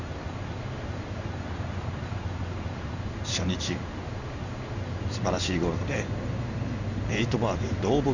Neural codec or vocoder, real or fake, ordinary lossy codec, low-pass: codec, 16 kHz in and 24 kHz out, 1 kbps, XY-Tokenizer; fake; none; 7.2 kHz